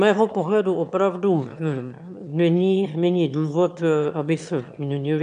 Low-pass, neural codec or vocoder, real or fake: 9.9 kHz; autoencoder, 22.05 kHz, a latent of 192 numbers a frame, VITS, trained on one speaker; fake